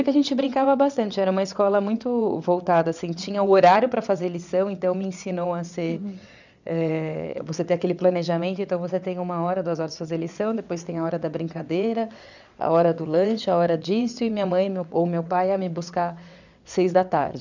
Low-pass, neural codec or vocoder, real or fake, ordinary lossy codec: 7.2 kHz; vocoder, 22.05 kHz, 80 mel bands, WaveNeXt; fake; none